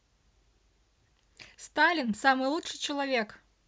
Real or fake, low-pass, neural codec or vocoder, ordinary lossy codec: real; none; none; none